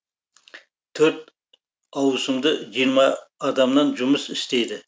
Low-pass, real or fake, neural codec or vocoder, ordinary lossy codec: none; real; none; none